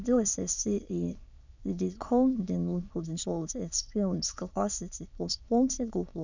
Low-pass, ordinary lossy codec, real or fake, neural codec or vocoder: 7.2 kHz; none; fake; autoencoder, 22.05 kHz, a latent of 192 numbers a frame, VITS, trained on many speakers